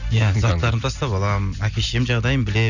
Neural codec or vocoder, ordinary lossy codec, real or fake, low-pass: none; none; real; 7.2 kHz